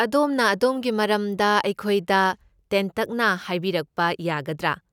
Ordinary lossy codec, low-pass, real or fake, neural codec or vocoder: none; 19.8 kHz; real; none